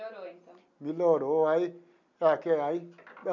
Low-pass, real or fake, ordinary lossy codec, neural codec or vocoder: 7.2 kHz; real; none; none